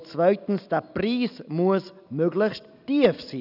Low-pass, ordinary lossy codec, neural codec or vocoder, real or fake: 5.4 kHz; none; none; real